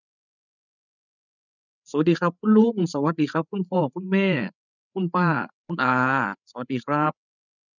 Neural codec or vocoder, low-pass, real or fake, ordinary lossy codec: codec, 16 kHz, 8 kbps, FreqCodec, larger model; 7.2 kHz; fake; none